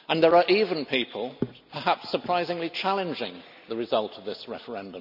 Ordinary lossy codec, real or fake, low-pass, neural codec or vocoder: none; real; 5.4 kHz; none